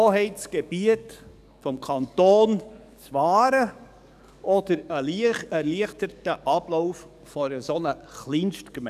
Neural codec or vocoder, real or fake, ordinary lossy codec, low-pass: codec, 44.1 kHz, 7.8 kbps, DAC; fake; none; 14.4 kHz